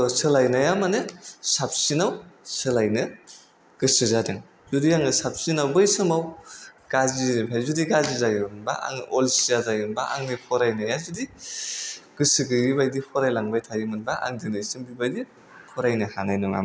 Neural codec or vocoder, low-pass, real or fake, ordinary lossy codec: none; none; real; none